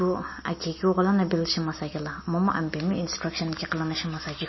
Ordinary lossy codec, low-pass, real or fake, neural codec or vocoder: MP3, 24 kbps; 7.2 kHz; real; none